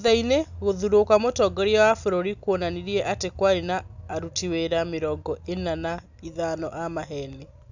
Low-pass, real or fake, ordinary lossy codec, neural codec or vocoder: 7.2 kHz; real; none; none